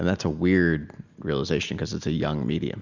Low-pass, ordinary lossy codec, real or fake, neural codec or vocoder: 7.2 kHz; Opus, 64 kbps; real; none